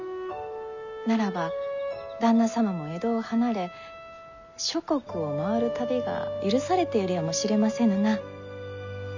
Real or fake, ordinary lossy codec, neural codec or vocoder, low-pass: real; none; none; 7.2 kHz